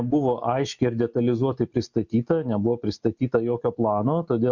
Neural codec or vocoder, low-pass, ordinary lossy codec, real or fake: none; 7.2 kHz; Opus, 64 kbps; real